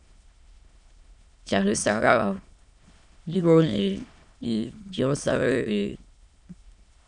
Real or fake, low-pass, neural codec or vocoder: fake; 9.9 kHz; autoencoder, 22.05 kHz, a latent of 192 numbers a frame, VITS, trained on many speakers